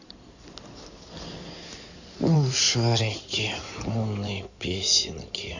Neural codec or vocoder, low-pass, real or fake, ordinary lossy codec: codec, 16 kHz in and 24 kHz out, 2.2 kbps, FireRedTTS-2 codec; 7.2 kHz; fake; none